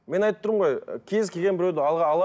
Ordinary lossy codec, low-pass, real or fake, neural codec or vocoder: none; none; real; none